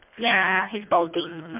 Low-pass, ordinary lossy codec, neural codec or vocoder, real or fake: 3.6 kHz; MP3, 32 kbps; codec, 24 kHz, 1.5 kbps, HILCodec; fake